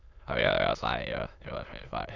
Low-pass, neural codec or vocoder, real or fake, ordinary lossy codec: 7.2 kHz; autoencoder, 22.05 kHz, a latent of 192 numbers a frame, VITS, trained on many speakers; fake; none